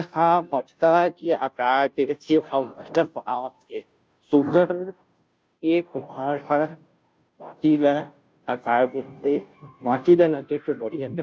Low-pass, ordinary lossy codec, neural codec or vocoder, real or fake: none; none; codec, 16 kHz, 0.5 kbps, FunCodec, trained on Chinese and English, 25 frames a second; fake